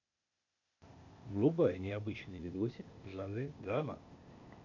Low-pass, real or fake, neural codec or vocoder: 7.2 kHz; fake; codec, 16 kHz, 0.8 kbps, ZipCodec